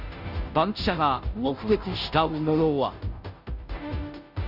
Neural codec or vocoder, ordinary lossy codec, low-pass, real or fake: codec, 16 kHz, 0.5 kbps, FunCodec, trained on Chinese and English, 25 frames a second; none; 5.4 kHz; fake